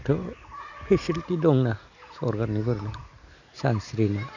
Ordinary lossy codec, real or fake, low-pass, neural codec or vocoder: none; real; 7.2 kHz; none